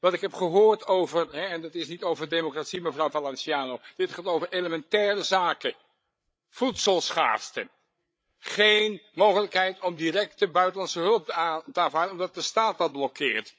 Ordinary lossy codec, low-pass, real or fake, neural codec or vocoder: none; none; fake; codec, 16 kHz, 8 kbps, FreqCodec, larger model